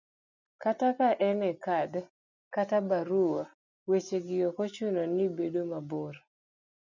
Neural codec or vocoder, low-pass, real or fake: none; 7.2 kHz; real